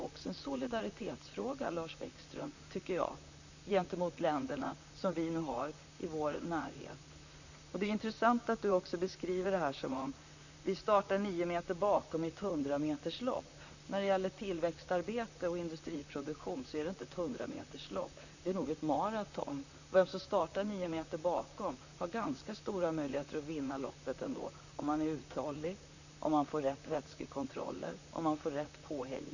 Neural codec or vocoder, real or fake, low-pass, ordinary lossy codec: vocoder, 44.1 kHz, 128 mel bands, Pupu-Vocoder; fake; 7.2 kHz; none